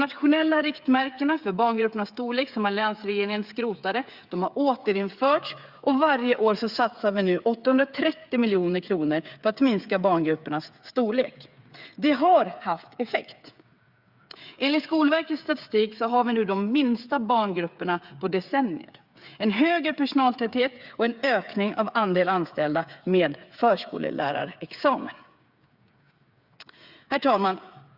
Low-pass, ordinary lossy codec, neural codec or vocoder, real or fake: 5.4 kHz; Opus, 64 kbps; codec, 16 kHz, 8 kbps, FreqCodec, smaller model; fake